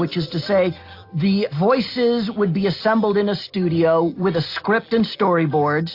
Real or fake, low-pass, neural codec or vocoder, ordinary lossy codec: real; 5.4 kHz; none; AAC, 32 kbps